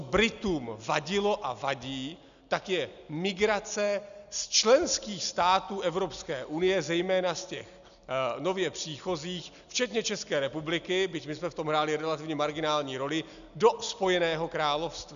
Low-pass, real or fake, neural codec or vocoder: 7.2 kHz; real; none